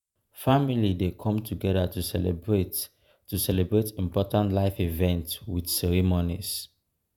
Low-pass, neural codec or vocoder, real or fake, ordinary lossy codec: none; vocoder, 48 kHz, 128 mel bands, Vocos; fake; none